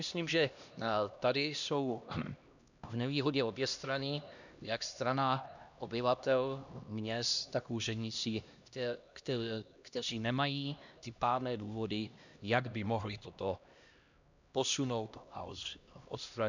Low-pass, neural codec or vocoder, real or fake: 7.2 kHz; codec, 16 kHz, 1 kbps, X-Codec, HuBERT features, trained on LibriSpeech; fake